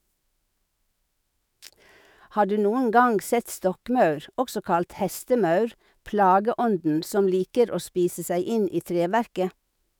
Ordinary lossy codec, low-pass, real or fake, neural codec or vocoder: none; none; fake; autoencoder, 48 kHz, 128 numbers a frame, DAC-VAE, trained on Japanese speech